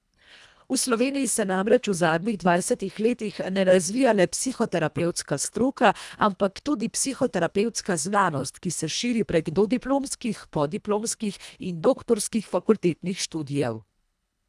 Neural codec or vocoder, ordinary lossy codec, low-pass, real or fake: codec, 24 kHz, 1.5 kbps, HILCodec; none; none; fake